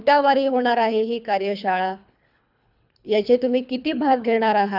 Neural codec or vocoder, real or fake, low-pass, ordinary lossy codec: codec, 24 kHz, 3 kbps, HILCodec; fake; 5.4 kHz; none